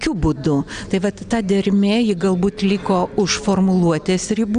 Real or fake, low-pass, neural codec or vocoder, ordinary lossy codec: real; 9.9 kHz; none; Opus, 64 kbps